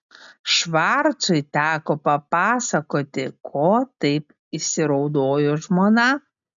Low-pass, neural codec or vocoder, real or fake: 7.2 kHz; none; real